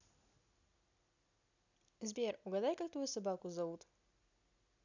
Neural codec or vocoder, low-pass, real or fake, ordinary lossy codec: none; 7.2 kHz; real; none